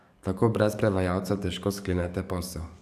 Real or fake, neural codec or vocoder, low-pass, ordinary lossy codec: fake; codec, 44.1 kHz, 7.8 kbps, DAC; 14.4 kHz; AAC, 96 kbps